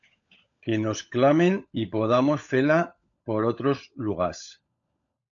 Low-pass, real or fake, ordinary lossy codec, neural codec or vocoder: 7.2 kHz; fake; AAC, 64 kbps; codec, 16 kHz, 16 kbps, FunCodec, trained on LibriTTS, 50 frames a second